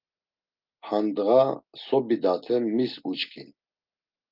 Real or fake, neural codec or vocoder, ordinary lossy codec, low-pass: real; none; Opus, 32 kbps; 5.4 kHz